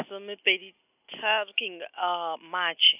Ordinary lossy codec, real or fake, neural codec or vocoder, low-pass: AAC, 32 kbps; real; none; 3.6 kHz